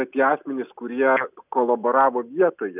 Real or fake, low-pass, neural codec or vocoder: real; 3.6 kHz; none